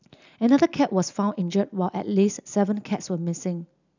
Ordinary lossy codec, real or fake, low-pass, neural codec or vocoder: none; real; 7.2 kHz; none